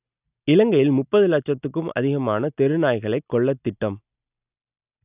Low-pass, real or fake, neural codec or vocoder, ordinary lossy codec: 3.6 kHz; real; none; none